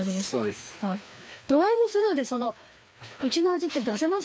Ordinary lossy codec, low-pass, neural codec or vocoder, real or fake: none; none; codec, 16 kHz, 2 kbps, FreqCodec, larger model; fake